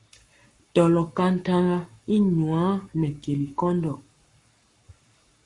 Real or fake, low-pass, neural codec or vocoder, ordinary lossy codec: fake; 10.8 kHz; codec, 44.1 kHz, 7.8 kbps, Pupu-Codec; Opus, 64 kbps